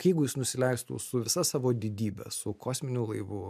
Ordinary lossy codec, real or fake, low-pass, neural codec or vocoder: MP3, 96 kbps; real; 14.4 kHz; none